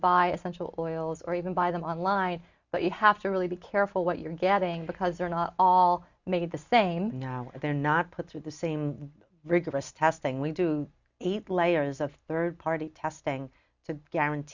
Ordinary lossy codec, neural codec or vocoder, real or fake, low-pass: Opus, 64 kbps; none; real; 7.2 kHz